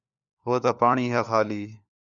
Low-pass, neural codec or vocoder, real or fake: 7.2 kHz; codec, 16 kHz, 4 kbps, FunCodec, trained on LibriTTS, 50 frames a second; fake